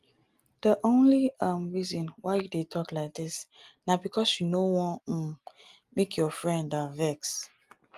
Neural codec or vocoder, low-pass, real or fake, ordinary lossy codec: none; 14.4 kHz; real; Opus, 32 kbps